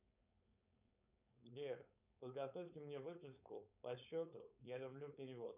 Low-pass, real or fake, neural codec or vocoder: 3.6 kHz; fake; codec, 16 kHz, 4.8 kbps, FACodec